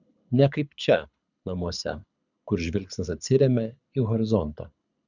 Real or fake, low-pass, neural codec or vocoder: fake; 7.2 kHz; codec, 24 kHz, 6 kbps, HILCodec